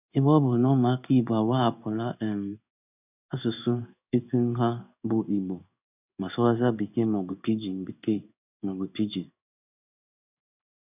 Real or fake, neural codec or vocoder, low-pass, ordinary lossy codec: fake; codec, 16 kHz in and 24 kHz out, 1 kbps, XY-Tokenizer; 3.6 kHz; none